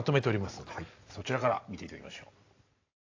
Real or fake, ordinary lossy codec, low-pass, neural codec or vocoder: real; AAC, 32 kbps; 7.2 kHz; none